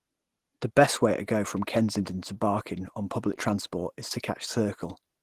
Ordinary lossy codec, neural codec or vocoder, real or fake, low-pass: Opus, 16 kbps; none; real; 14.4 kHz